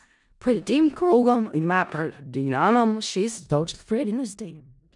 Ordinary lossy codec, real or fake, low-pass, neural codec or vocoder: none; fake; 10.8 kHz; codec, 16 kHz in and 24 kHz out, 0.4 kbps, LongCat-Audio-Codec, four codebook decoder